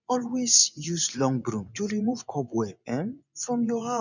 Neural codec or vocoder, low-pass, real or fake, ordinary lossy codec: vocoder, 24 kHz, 100 mel bands, Vocos; 7.2 kHz; fake; none